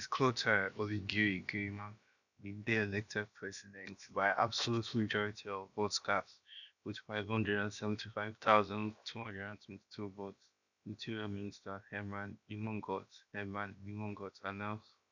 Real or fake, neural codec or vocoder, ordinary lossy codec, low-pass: fake; codec, 16 kHz, about 1 kbps, DyCAST, with the encoder's durations; none; 7.2 kHz